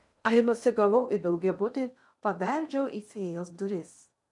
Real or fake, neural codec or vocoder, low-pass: fake; codec, 16 kHz in and 24 kHz out, 0.6 kbps, FocalCodec, streaming, 2048 codes; 10.8 kHz